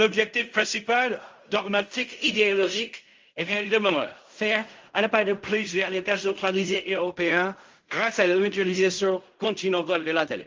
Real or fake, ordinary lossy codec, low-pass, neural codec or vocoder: fake; Opus, 32 kbps; 7.2 kHz; codec, 16 kHz in and 24 kHz out, 0.4 kbps, LongCat-Audio-Codec, fine tuned four codebook decoder